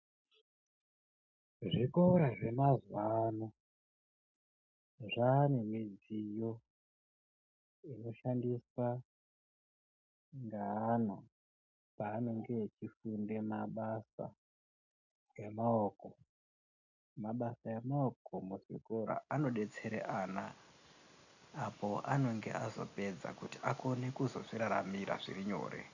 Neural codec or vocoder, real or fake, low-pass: none; real; 7.2 kHz